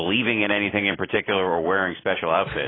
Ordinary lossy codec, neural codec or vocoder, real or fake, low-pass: AAC, 16 kbps; none; real; 7.2 kHz